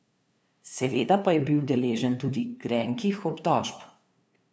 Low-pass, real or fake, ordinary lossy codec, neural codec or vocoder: none; fake; none; codec, 16 kHz, 2 kbps, FunCodec, trained on LibriTTS, 25 frames a second